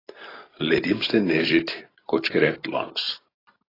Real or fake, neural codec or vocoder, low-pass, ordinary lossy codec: real; none; 5.4 kHz; AAC, 24 kbps